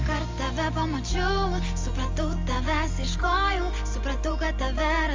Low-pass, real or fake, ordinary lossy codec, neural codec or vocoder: 7.2 kHz; real; Opus, 32 kbps; none